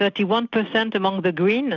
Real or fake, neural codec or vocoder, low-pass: real; none; 7.2 kHz